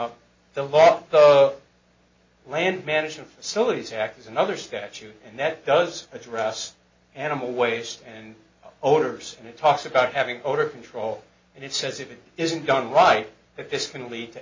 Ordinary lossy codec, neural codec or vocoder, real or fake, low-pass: MP3, 32 kbps; none; real; 7.2 kHz